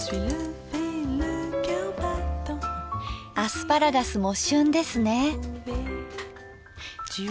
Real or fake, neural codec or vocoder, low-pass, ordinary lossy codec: real; none; none; none